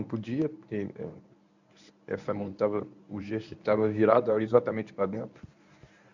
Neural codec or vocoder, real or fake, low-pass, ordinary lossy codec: codec, 24 kHz, 0.9 kbps, WavTokenizer, medium speech release version 1; fake; 7.2 kHz; none